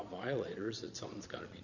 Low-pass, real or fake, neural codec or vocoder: 7.2 kHz; fake; vocoder, 22.05 kHz, 80 mel bands, Vocos